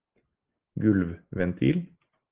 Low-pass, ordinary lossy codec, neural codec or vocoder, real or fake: 3.6 kHz; Opus, 16 kbps; none; real